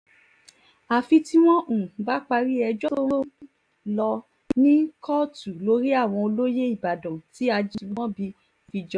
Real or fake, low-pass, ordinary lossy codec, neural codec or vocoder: real; 9.9 kHz; AAC, 64 kbps; none